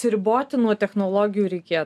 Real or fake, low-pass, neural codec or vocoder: real; 14.4 kHz; none